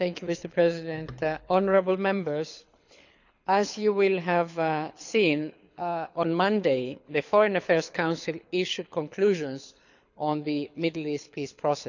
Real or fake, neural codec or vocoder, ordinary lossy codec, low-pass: fake; codec, 24 kHz, 6 kbps, HILCodec; none; 7.2 kHz